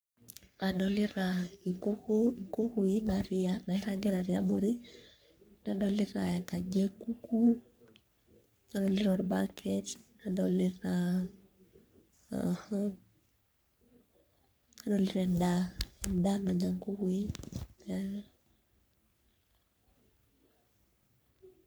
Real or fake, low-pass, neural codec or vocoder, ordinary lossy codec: fake; none; codec, 44.1 kHz, 3.4 kbps, Pupu-Codec; none